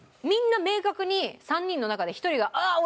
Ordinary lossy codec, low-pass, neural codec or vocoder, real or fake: none; none; none; real